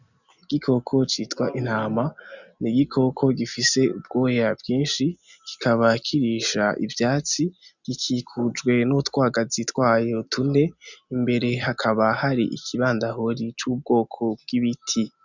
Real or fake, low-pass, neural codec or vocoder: real; 7.2 kHz; none